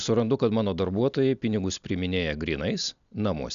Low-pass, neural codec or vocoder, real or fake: 7.2 kHz; none; real